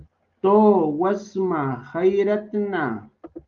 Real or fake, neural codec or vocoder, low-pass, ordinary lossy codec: real; none; 7.2 kHz; Opus, 32 kbps